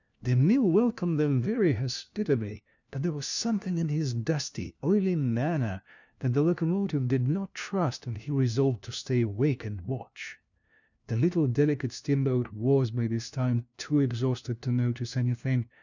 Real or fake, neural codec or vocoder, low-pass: fake; codec, 16 kHz, 1 kbps, FunCodec, trained on LibriTTS, 50 frames a second; 7.2 kHz